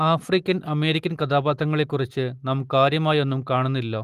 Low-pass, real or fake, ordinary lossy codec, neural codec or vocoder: 14.4 kHz; real; Opus, 24 kbps; none